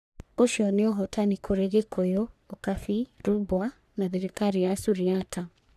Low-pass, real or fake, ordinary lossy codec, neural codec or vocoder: 14.4 kHz; fake; none; codec, 44.1 kHz, 3.4 kbps, Pupu-Codec